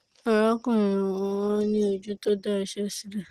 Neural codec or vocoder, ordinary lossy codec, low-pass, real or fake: none; Opus, 16 kbps; 14.4 kHz; real